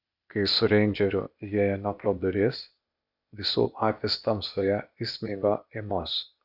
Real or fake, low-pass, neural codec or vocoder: fake; 5.4 kHz; codec, 16 kHz, 0.8 kbps, ZipCodec